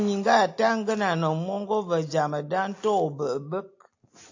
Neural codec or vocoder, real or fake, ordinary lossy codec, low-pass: none; real; AAC, 48 kbps; 7.2 kHz